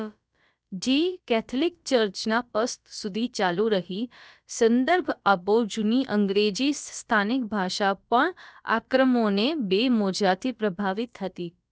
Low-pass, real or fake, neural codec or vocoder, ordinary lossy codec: none; fake; codec, 16 kHz, about 1 kbps, DyCAST, with the encoder's durations; none